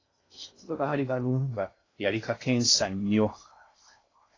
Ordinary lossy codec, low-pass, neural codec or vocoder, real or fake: AAC, 32 kbps; 7.2 kHz; codec, 16 kHz in and 24 kHz out, 0.6 kbps, FocalCodec, streaming, 2048 codes; fake